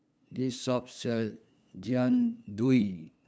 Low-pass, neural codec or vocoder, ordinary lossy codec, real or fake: none; codec, 16 kHz, 2 kbps, FunCodec, trained on LibriTTS, 25 frames a second; none; fake